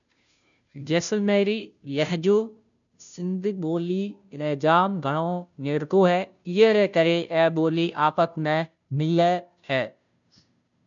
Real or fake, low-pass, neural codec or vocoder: fake; 7.2 kHz; codec, 16 kHz, 0.5 kbps, FunCodec, trained on Chinese and English, 25 frames a second